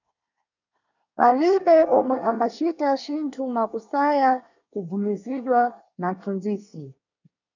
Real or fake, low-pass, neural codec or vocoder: fake; 7.2 kHz; codec, 24 kHz, 1 kbps, SNAC